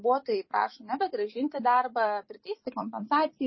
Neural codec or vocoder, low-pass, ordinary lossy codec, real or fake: vocoder, 44.1 kHz, 128 mel bands every 256 samples, BigVGAN v2; 7.2 kHz; MP3, 24 kbps; fake